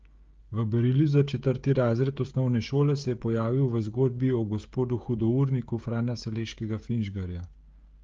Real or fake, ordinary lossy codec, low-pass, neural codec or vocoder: fake; Opus, 32 kbps; 7.2 kHz; codec, 16 kHz, 16 kbps, FreqCodec, smaller model